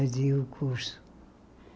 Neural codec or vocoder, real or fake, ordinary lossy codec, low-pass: none; real; none; none